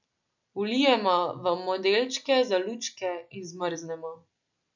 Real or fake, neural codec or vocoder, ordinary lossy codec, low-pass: real; none; none; 7.2 kHz